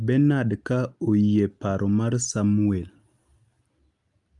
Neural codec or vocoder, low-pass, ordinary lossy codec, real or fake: none; 10.8 kHz; Opus, 32 kbps; real